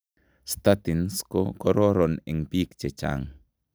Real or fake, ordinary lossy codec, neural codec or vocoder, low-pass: real; none; none; none